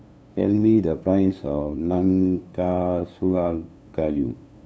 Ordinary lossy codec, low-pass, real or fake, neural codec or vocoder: none; none; fake; codec, 16 kHz, 2 kbps, FunCodec, trained on LibriTTS, 25 frames a second